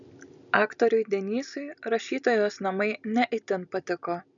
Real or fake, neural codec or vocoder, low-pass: real; none; 7.2 kHz